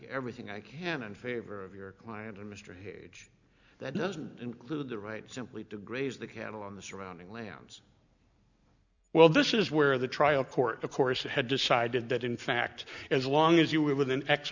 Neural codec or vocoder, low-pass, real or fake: none; 7.2 kHz; real